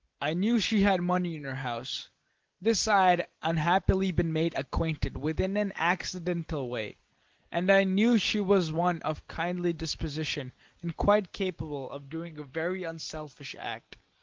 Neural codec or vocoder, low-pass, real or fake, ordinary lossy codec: none; 7.2 kHz; real; Opus, 16 kbps